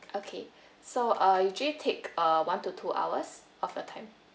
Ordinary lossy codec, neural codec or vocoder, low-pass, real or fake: none; none; none; real